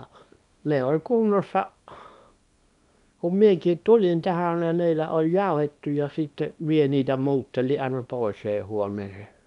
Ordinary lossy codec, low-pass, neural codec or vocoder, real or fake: MP3, 96 kbps; 10.8 kHz; codec, 24 kHz, 0.9 kbps, WavTokenizer, small release; fake